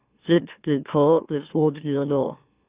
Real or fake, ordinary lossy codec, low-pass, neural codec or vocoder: fake; Opus, 64 kbps; 3.6 kHz; autoencoder, 44.1 kHz, a latent of 192 numbers a frame, MeloTTS